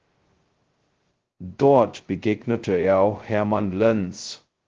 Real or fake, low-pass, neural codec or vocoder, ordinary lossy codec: fake; 7.2 kHz; codec, 16 kHz, 0.2 kbps, FocalCodec; Opus, 16 kbps